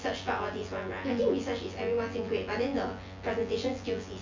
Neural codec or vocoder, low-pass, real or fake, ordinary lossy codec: vocoder, 24 kHz, 100 mel bands, Vocos; 7.2 kHz; fake; MP3, 48 kbps